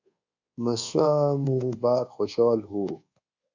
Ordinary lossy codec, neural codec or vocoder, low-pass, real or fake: Opus, 64 kbps; codec, 24 kHz, 1.2 kbps, DualCodec; 7.2 kHz; fake